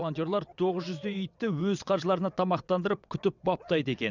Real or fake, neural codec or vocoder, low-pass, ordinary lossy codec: fake; vocoder, 22.05 kHz, 80 mel bands, WaveNeXt; 7.2 kHz; none